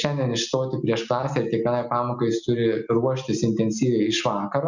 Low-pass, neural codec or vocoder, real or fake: 7.2 kHz; none; real